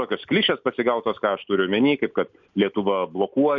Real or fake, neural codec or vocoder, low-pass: real; none; 7.2 kHz